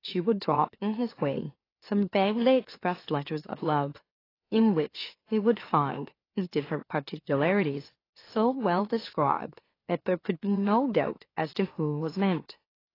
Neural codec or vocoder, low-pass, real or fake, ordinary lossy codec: autoencoder, 44.1 kHz, a latent of 192 numbers a frame, MeloTTS; 5.4 kHz; fake; AAC, 24 kbps